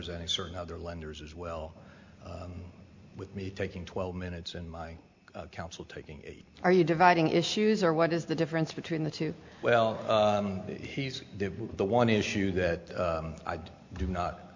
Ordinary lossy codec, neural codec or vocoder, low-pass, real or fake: MP3, 64 kbps; none; 7.2 kHz; real